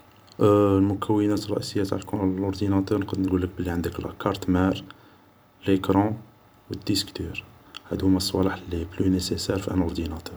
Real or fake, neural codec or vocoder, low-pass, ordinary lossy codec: fake; vocoder, 44.1 kHz, 128 mel bands every 256 samples, BigVGAN v2; none; none